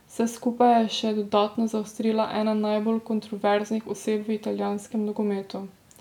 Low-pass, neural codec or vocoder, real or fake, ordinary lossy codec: 19.8 kHz; none; real; none